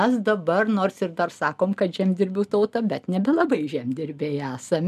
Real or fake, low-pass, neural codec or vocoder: real; 14.4 kHz; none